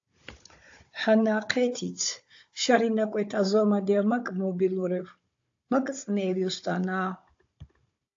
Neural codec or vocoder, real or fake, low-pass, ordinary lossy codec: codec, 16 kHz, 16 kbps, FunCodec, trained on Chinese and English, 50 frames a second; fake; 7.2 kHz; AAC, 48 kbps